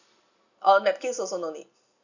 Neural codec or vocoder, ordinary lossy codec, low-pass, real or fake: none; none; 7.2 kHz; real